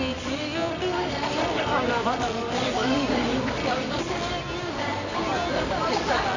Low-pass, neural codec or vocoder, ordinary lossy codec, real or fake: 7.2 kHz; codec, 24 kHz, 0.9 kbps, WavTokenizer, medium music audio release; none; fake